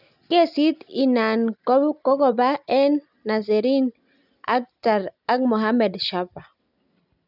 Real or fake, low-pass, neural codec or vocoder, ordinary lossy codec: real; 5.4 kHz; none; none